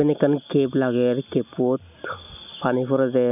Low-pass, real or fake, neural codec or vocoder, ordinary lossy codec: 3.6 kHz; real; none; none